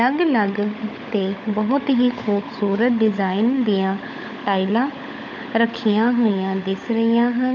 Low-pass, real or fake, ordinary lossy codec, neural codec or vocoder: 7.2 kHz; fake; none; codec, 16 kHz, 16 kbps, FunCodec, trained on LibriTTS, 50 frames a second